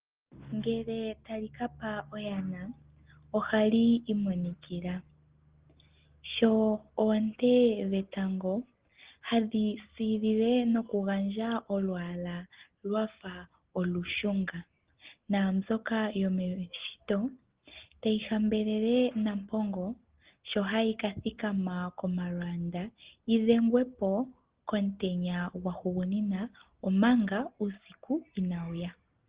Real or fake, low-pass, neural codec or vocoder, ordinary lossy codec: real; 3.6 kHz; none; Opus, 16 kbps